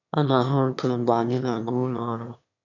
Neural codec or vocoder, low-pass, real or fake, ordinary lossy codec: autoencoder, 22.05 kHz, a latent of 192 numbers a frame, VITS, trained on one speaker; 7.2 kHz; fake; none